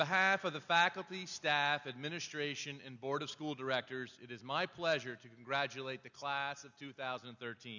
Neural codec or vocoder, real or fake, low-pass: none; real; 7.2 kHz